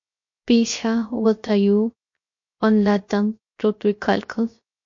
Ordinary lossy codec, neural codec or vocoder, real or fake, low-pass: MP3, 48 kbps; codec, 16 kHz, 0.3 kbps, FocalCodec; fake; 7.2 kHz